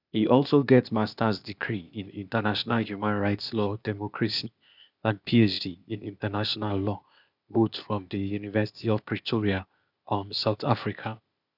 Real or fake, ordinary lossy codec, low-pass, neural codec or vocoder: fake; none; 5.4 kHz; codec, 16 kHz, 0.8 kbps, ZipCodec